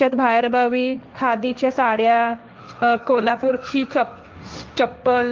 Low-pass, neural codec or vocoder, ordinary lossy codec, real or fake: 7.2 kHz; codec, 16 kHz, 1.1 kbps, Voila-Tokenizer; Opus, 32 kbps; fake